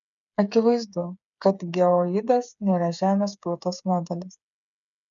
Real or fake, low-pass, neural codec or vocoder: fake; 7.2 kHz; codec, 16 kHz, 8 kbps, FreqCodec, smaller model